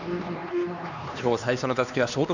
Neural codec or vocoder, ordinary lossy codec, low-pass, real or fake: codec, 16 kHz, 2 kbps, X-Codec, HuBERT features, trained on LibriSpeech; none; 7.2 kHz; fake